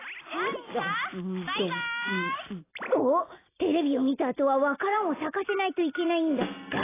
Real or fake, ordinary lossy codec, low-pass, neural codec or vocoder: real; AAC, 16 kbps; 3.6 kHz; none